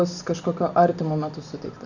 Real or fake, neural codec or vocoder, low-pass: real; none; 7.2 kHz